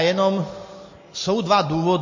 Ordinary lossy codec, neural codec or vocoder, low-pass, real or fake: MP3, 32 kbps; none; 7.2 kHz; real